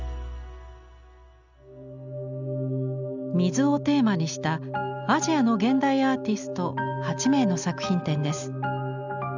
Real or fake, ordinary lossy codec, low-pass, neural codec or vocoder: real; none; 7.2 kHz; none